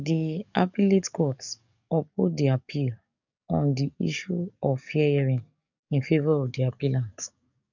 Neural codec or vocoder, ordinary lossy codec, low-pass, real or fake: codec, 44.1 kHz, 7.8 kbps, DAC; none; 7.2 kHz; fake